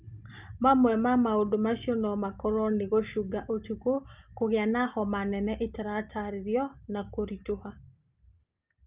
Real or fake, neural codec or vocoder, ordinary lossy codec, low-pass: real; none; Opus, 32 kbps; 3.6 kHz